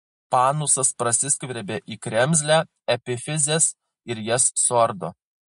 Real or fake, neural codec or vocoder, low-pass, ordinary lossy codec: real; none; 14.4 kHz; MP3, 48 kbps